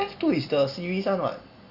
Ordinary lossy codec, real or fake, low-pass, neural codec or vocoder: Opus, 64 kbps; real; 5.4 kHz; none